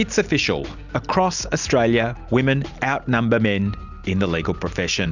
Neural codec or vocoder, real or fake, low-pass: none; real; 7.2 kHz